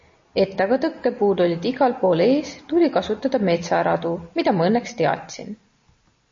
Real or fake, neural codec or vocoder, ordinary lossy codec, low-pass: real; none; MP3, 32 kbps; 7.2 kHz